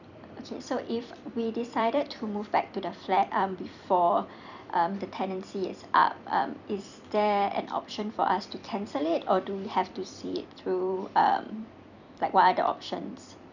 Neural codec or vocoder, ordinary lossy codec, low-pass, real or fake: vocoder, 44.1 kHz, 128 mel bands every 256 samples, BigVGAN v2; none; 7.2 kHz; fake